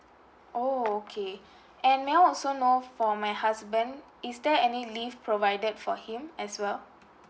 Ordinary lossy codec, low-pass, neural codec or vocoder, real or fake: none; none; none; real